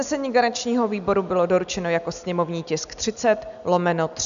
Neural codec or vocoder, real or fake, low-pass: none; real; 7.2 kHz